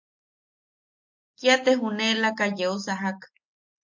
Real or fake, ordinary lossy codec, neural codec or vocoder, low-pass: real; MP3, 48 kbps; none; 7.2 kHz